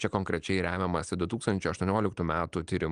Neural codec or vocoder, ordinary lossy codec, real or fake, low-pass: none; Opus, 32 kbps; real; 9.9 kHz